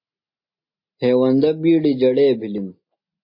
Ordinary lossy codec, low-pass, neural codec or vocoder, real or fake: MP3, 32 kbps; 5.4 kHz; none; real